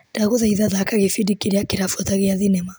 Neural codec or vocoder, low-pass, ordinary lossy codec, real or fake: none; none; none; real